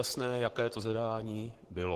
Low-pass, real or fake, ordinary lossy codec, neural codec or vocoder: 14.4 kHz; fake; Opus, 16 kbps; codec, 44.1 kHz, 7.8 kbps, Pupu-Codec